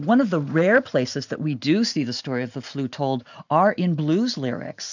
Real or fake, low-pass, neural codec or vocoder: real; 7.2 kHz; none